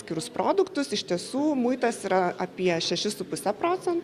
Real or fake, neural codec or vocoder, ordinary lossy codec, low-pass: real; none; Opus, 64 kbps; 14.4 kHz